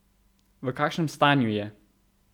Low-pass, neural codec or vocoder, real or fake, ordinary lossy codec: 19.8 kHz; none; real; none